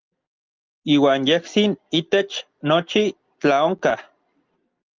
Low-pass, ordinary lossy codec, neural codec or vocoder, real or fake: 7.2 kHz; Opus, 24 kbps; none; real